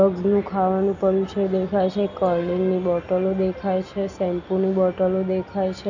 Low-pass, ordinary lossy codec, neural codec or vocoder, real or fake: 7.2 kHz; none; none; real